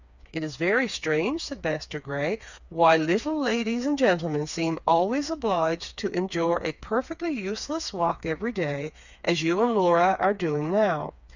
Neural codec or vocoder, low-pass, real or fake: codec, 16 kHz, 4 kbps, FreqCodec, smaller model; 7.2 kHz; fake